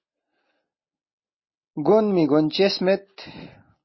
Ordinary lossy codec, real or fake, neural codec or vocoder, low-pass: MP3, 24 kbps; real; none; 7.2 kHz